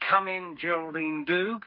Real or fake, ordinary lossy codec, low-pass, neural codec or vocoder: fake; MP3, 32 kbps; 5.4 kHz; codec, 32 kHz, 1.9 kbps, SNAC